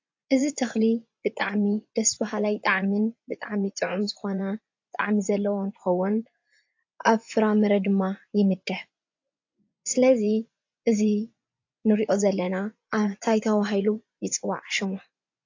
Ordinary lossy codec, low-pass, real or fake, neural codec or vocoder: AAC, 48 kbps; 7.2 kHz; fake; vocoder, 24 kHz, 100 mel bands, Vocos